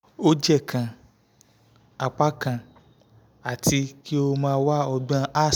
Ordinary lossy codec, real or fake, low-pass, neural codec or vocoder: none; real; none; none